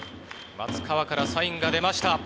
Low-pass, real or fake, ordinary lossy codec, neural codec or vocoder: none; real; none; none